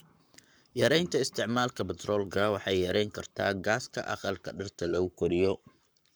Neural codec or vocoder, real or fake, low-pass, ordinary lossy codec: codec, 44.1 kHz, 7.8 kbps, Pupu-Codec; fake; none; none